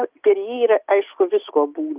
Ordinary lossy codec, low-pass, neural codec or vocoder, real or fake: Opus, 32 kbps; 3.6 kHz; none; real